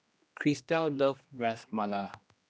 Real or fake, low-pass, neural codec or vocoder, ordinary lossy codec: fake; none; codec, 16 kHz, 1 kbps, X-Codec, HuBERT features, trained on general audio; none